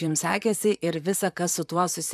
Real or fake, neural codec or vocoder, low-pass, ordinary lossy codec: fake; vocoder, 44.1 kHz, 128 mel bands, Pupu-Vocoder; 14.4 kHz; AAC, 96 kbps